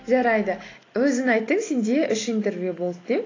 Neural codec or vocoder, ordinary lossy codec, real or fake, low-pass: none; AAC, 32 kbps; real; 7.2 kHz